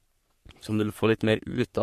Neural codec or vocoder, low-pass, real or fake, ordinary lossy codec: codec, 44.1 kHz, 3.4 kbps, Pupu-Codec; 14.4 kHz; fake; MP3, 64 kbps